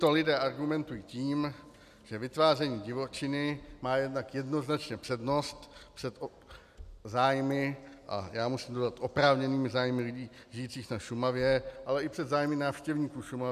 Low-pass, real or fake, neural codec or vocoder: 14.4 kHz; real; none